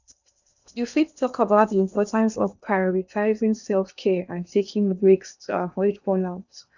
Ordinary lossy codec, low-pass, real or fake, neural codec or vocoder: none; 7.2 kHz; fake; codec, 16 kHz in and 24 kHz out, 0.8 kbps, FocalCodec, streaming, 65536 codes